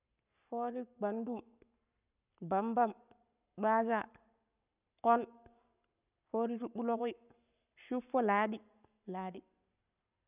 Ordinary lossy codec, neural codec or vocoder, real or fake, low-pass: none; codec, 44.1 kHz, 7.8 kbps, Pupu-Codec; fake; 3.6 kHz